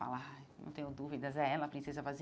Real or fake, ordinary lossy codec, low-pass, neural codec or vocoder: real; none; none; none